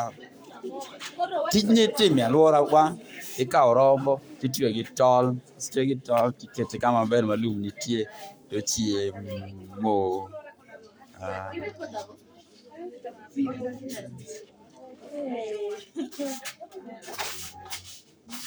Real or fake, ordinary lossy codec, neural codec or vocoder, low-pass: fake; none; codec, 44.1 kHz, 7.8 kbps, Pupu-Codec; none